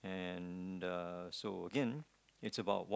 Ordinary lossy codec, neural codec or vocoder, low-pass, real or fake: none; none; none; real